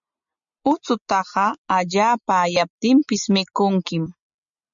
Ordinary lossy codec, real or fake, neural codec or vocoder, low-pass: MP3, 64 kbps; real; none; 7.2 kHz